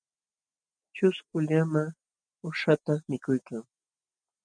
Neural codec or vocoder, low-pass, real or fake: none; 9.9 kHz; real